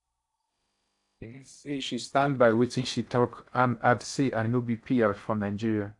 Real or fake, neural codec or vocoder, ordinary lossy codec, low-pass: fake; codec, 16 kHz in and 24 kHz out, 0.6 kbps, FocalCodec, streaming, 4096 codes; AAC, 64 kbps; 10.8 kHz